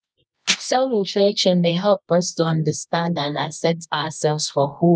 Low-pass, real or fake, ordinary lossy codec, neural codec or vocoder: 9.9 kHz; fake; none; codec, 24 kHz, 0.9 kbps, WavTokenizer, medium music audio release